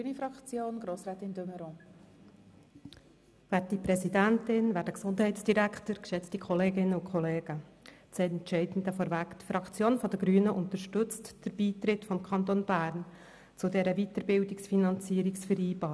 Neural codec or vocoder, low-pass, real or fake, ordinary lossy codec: none; none; real; none